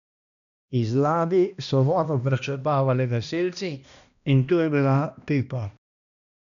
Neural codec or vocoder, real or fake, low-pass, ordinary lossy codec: codec, 16 kHz, 1 kbps, X-Codec, HuBERT features, trained on balanced general audio; fake; 7.2 kHz; none